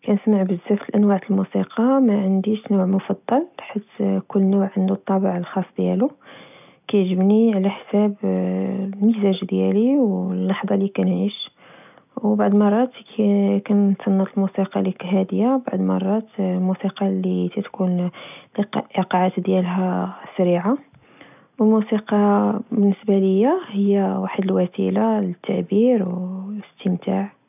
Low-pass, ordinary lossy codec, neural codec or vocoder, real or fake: 3.6 kHz; none; none; real